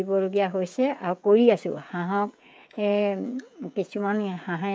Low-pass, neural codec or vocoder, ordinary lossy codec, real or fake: none; codec, 16 kHz, 16 kbps, FreqCodec, smaller model; none; fake